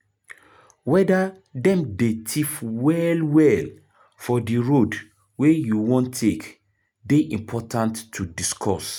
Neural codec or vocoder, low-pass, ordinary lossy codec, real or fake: none; 19.8 kHz; none; real